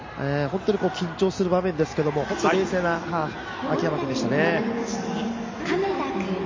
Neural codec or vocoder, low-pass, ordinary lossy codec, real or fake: none; 7.2 kHz; MP3, 32 kbps; real